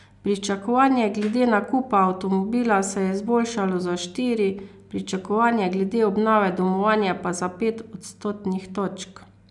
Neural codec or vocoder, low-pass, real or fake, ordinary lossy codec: none; 10.8 kHz; real; none